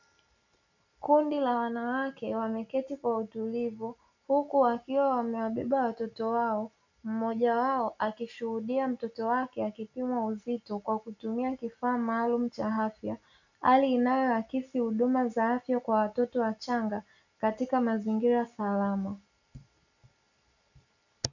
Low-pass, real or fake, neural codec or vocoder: 7.2 kHz; real; none